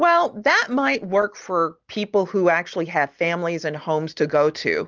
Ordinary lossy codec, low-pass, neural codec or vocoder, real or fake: Opus, 24 kbps; 7.2 kHz; none; real